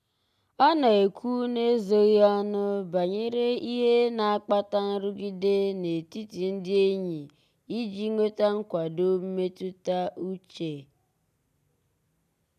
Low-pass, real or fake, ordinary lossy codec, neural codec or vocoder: 14.4 kHz; real; none; none